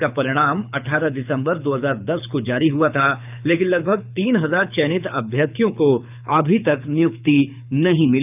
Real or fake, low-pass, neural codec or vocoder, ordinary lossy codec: fake; 3.6 kHz; codec, 24 kHz, 6 kbps, HILCodec; none